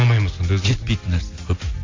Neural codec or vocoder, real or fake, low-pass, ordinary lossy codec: none; real; 7.2 kHz; AAC, 32 kbps